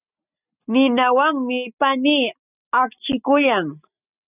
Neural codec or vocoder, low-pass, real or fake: none; 3.6 kHz; real